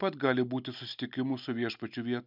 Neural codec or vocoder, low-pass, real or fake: none; 5.4 kHz; real